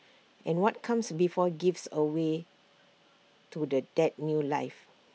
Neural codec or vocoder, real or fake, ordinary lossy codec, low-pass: none; real; none; none